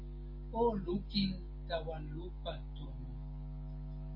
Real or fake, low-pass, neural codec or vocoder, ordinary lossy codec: real; 5.4 kHz; none; MP3, 24 kbps